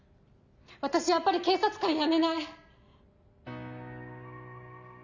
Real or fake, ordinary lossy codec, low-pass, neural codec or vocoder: real; none; 7.2 kHz; none